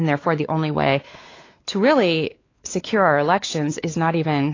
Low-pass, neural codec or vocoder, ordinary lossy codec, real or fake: 7.2 kHz; codec, 16 kHz, 4 kbps, X-Codec, WavLM features, trained on Multilingual LibriSpeech; AAC, 32 kbps; fake